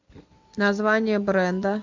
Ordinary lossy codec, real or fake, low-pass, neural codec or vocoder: MP3, 64 kbps; real; 7.2 kHz; none